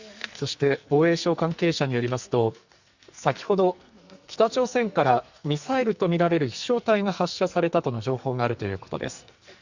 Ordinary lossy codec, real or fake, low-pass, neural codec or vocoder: Opus, 64 kbps; fake; 7.2 kHz; codec, 44.1 kHz, 2.6 kbps, SNAC